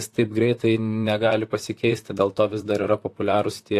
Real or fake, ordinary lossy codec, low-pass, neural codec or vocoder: fake; AAC, 64 kbps; 14.4 kHz; vocoder, 44.1 kHz, 128 mel bands, Pupu-Vocoder